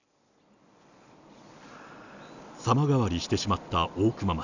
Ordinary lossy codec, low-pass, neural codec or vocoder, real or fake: none; 7.2 kHz; none; real